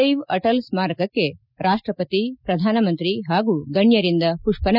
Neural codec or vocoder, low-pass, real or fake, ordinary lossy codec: none; 5.4 kHz; real; none